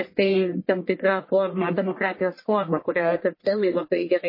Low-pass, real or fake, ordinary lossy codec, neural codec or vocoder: 5.4 kHz; fake; MP3, 24 kbps; codec, 44.1 kHz, 1.7 kbps, Pupu-Codec